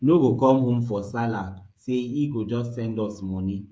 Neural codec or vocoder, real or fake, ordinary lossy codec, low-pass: codec, 16 kHz, 8 kbps, FreqCodec, smaller model; fake; none; none